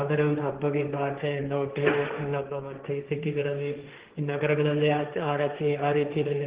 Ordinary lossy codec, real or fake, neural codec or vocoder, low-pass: Opus, 16 kbps; fake; codec, 16 kHz, 1.1 kbps, Voila-Tokenizer; 3.6 kHz